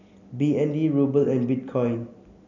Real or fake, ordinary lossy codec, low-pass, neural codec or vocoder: real; none; 7.2 kHz; none